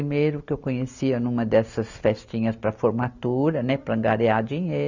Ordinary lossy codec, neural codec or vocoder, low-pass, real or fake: none; none; 7.2 kHz; real